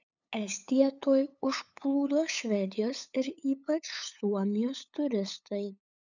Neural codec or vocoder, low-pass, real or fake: codec, 16 kHz, 8 kbps, FunCodec, trained on LibriTTS, 25 frames a second; 7.2 kHz; fake